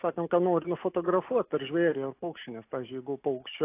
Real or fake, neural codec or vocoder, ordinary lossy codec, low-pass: real; none; AAC, 24 kbps; 3.6 kHz